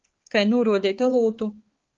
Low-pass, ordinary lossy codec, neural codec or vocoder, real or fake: 7.2 kHz; Opus, 16 kbps; codec, 16 kHz, 4 kbps, X-Codec, HuBERT features, trained on balanced general audio; fake